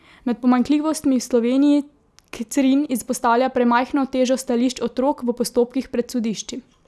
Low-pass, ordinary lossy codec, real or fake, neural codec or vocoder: none; none; real; none